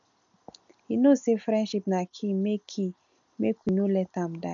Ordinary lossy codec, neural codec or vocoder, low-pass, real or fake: none; none; 7.2 kHz; real